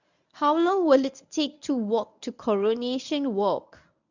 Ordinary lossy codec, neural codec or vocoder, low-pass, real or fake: none; codec, 24 kHz, 0.9 kbps, WavTokenizer, medium speech release version 1; 7.2 kHz; fake